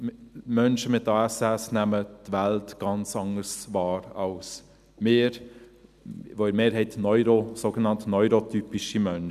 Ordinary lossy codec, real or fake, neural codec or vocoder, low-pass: none; real; none; 14.4 kHz